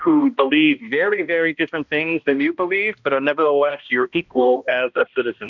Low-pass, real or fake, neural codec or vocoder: 7.2 kHz; fake; codec, 16 kHz, 1 kbps, X-Codec, HuBERT features, trained on balanced general audio